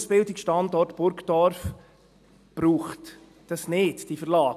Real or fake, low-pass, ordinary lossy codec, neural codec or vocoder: real; 14.4 kHz; none; none